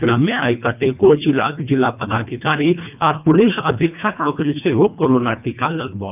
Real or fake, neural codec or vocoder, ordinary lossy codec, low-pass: fake; codec, 24 kHz, 1.5 kbps, HILCodec; none; 3.6 kHz